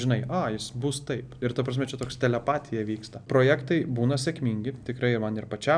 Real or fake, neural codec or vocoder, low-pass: real; none; 9.9 kHz